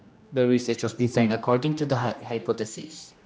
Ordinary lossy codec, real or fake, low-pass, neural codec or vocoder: none; fake; none; codec, 16 kHz, 1 kbps, X-Codec, HuBERT features, trained on general audio